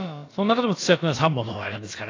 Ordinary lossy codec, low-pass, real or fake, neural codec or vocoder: AAC, 32 kbps; 7.2 kHz; fake; codec, 16 kHz, about 1 kbps, DyCAST, with the encoder's durations